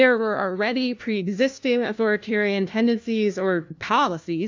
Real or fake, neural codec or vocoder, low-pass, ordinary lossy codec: fake; codec, 16 kHz, 1 kbps, FunCodec, trained on LibriTTS, 50 frames a second; 7.2 kHz; AAC, 48 kbps